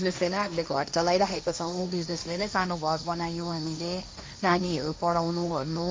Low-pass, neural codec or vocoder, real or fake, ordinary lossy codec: none; codec, 16 kHz, 1.1 kbps, Voila-Tokenizer; fake; none